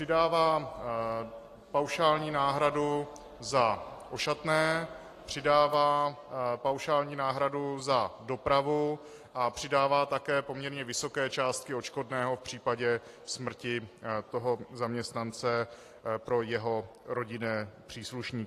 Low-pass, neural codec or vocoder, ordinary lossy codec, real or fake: 14.4 kHz; none; AAC, 48 kbps; real